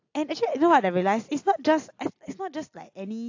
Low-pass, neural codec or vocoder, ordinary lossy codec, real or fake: 7.2 kHz; none; AAC, 48 kbps; real